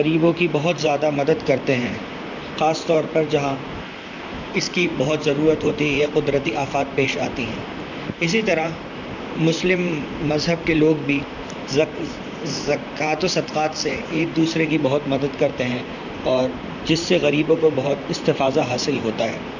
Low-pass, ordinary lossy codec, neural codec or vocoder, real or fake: 7.2 kHz; none; vocoder, 44.1 kHz, 128 mel bands, Pupu-Vocoder; fake